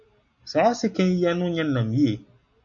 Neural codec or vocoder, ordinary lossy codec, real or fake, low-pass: none; MP3, 96 kbps; real; 7.2 kHz